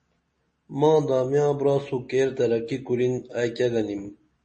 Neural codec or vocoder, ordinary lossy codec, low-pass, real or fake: none; MP3, 32 kbps; 10.8 kHz; real